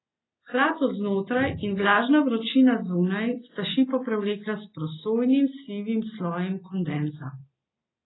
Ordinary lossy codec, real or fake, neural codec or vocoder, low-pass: AAC, 16 kbps; real; none; 7.2 kHz